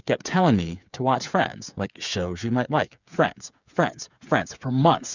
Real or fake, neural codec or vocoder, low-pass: fake; codec, 16 kHz, 8 kbps, FreqCodec, smaller model; 7.2 kHz